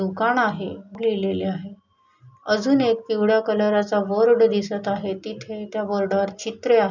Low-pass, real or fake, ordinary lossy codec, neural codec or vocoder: 7.2 kHz; real; none; none